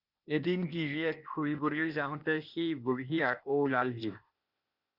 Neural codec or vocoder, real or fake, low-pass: codec, 16 kHz, 0.8 kbps, ZipCodec; fake; 5.4 kHz